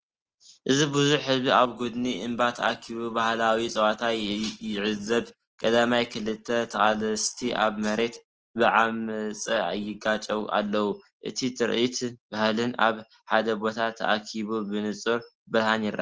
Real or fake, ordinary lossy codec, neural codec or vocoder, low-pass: real; Opus, 16 kbps; none; 7.2 kHz